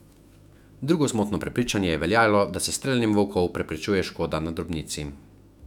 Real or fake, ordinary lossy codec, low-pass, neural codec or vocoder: fake; none; 19.8 kHz; autoencoder, 48 kHz, 128 numbers a frame, DAC-VAE, trained on Japanese speech